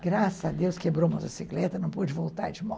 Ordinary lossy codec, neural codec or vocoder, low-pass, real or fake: none; none; none; real